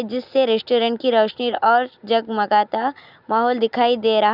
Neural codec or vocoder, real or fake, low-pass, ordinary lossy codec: none; real; 5.4 kHz; none